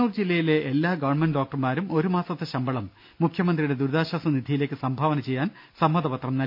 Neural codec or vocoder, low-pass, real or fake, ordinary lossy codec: none; 5.4 kHz; real; MP3, 48 kbps